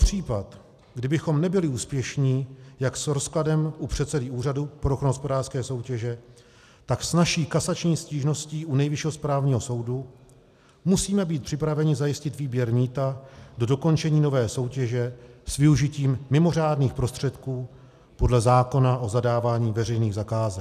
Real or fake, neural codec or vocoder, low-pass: real; none; 14.4 kHz